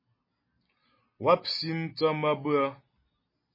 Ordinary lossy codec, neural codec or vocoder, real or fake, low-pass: MP3, 24 kbps; none; real; 5.4 kHz